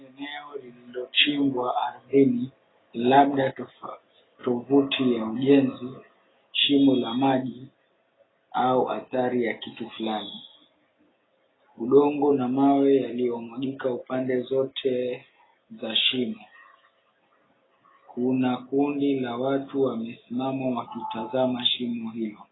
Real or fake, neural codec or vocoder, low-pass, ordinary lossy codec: real; none; 7.2 kHz; AAC, 16 kbps